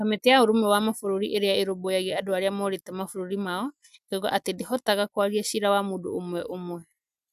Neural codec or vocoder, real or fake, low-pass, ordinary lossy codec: none; real; 14.4 kHz; none